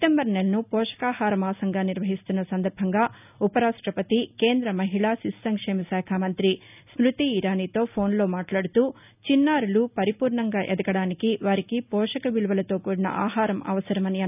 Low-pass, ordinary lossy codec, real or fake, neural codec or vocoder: 3.6 kHz; none; real; none